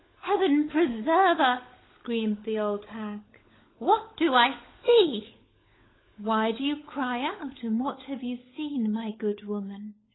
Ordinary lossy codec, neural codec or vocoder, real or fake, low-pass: AAC, 16 kbps; codec, 16 kHz, 16 kbps, FunCodec, trained on LibriTTS, 50 frames a second; fake; 7.2 kHz